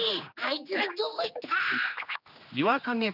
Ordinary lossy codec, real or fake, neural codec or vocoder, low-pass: none; fake; codec, 16 kHz, 2 kbps, X-Codec, HuBERT features, trained on general audio; 5.4 kHz